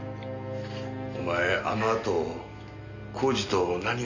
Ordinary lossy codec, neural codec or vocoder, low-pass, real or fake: none; none; 7.2 kHz; real